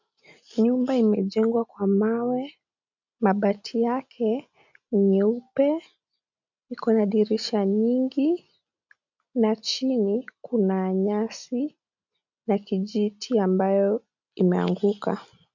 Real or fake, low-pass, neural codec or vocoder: real; 7.2 kHz; none